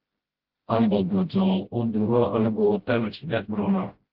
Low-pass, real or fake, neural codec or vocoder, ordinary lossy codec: 5.4 kHz; fake; codec, 16 kHz, 0.5 kbps, FreqCodec, smaller model; Opus, 16 kbps